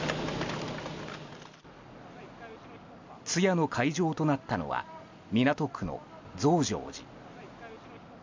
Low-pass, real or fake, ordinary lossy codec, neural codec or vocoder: 7.2 kHz; real; MP3, 64 kbps; none